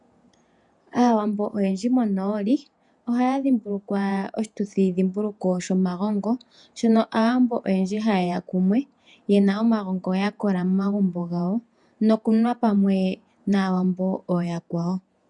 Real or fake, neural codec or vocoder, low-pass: fake; vocoder, 48 kHz, 128 mel bands, Vocos; 10.8 kHz